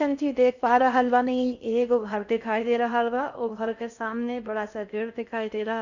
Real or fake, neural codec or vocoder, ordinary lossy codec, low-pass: fake; codec, 16 kHz in and 24 kHz out, 0.6 kbps, FocalCodec, streaming, 4096 codes; none; 7.2 kHz